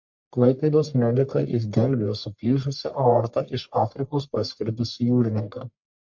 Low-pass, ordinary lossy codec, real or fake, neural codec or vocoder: 7.2 kHz; MP3, 48 kbps; fake; codec, 44.1 kHz, 1.7 kbps, Pupu-Codec